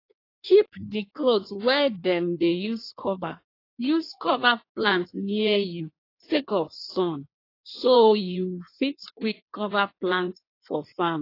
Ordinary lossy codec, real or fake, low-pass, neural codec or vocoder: AAC, 32 kbps; fake; 5.4 kHz; codec, 16 kHz in and 24 kHz out, 1.1 kbps, FireRedTTS-2 codec